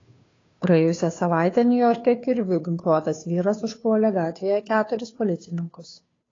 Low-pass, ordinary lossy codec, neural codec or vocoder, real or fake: 7.2 kHz; AAC, 32 kbps; codec, 16 kHz, 2 kbps, FunCodec, trained on Chinese and English, 25 frames a second; fake